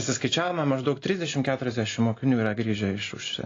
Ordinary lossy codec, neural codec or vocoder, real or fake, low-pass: AAC, 32 kbps; none; real; 7.2 kHz